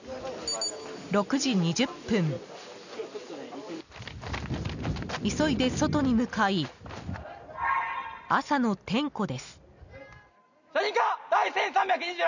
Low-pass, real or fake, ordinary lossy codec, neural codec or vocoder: 7.2 kHz; real; none; none